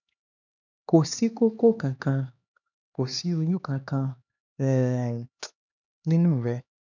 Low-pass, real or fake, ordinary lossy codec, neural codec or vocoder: 7.2 kHz; fake; none; codec, 16 kHz, 2 kbps, X-Codec, HuBERT features, trained on LibriSpeech